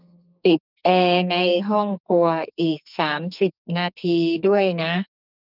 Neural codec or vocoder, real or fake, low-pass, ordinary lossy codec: codec, 44.1 kHz, 2.6 kbps, SNAC; fake; 5.4 kHz; none